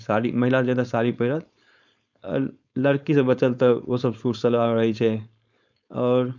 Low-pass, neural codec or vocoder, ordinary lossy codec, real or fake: 7.2 kHz; codec, 16 kHz, 4.8 kbps, FACodec; none; fake